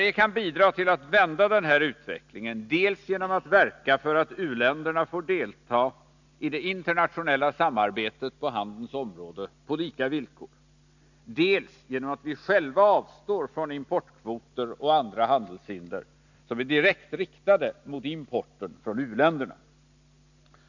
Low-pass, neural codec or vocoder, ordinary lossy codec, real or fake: 7.2 kHz; none; none; real